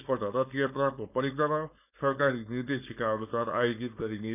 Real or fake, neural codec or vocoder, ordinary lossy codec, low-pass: fake; codec, 16 kHz, 4.8 kbps, FACodec; none; 3.6 kHz